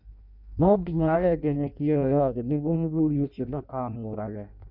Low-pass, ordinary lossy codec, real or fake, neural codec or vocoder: 5.4 kHz; none; fake; codec, 16 kHz in and 24 kHz out, 0.6 kbps, FireRedTTS-2 codec